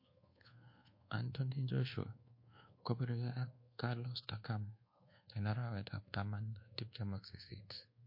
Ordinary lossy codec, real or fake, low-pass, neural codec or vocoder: MP3, 32 kbps; fake; 5.4 kHz; codec, 24 kHz, 1.2 kbps, DualCodec